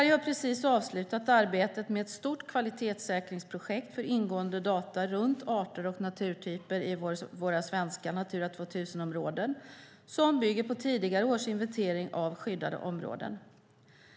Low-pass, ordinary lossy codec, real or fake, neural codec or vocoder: none; none; real; none